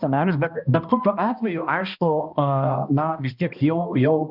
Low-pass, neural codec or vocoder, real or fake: 5.4 kHz; codec, 16 kHz, 1 kbps, X-Codec, HuBERT features, trained on balanced general audio; fake